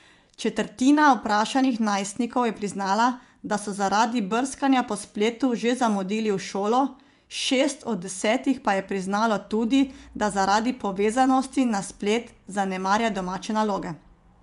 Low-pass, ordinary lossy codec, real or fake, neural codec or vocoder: 10.8 kHz; none; fake; vocoder, 24 kHz, 100 mel bands, Vocos